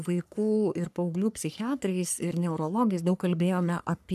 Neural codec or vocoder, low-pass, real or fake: codec, 44.1 kHz, 3.4 kbps, Pupu-Codec; 14.4 kHz; fake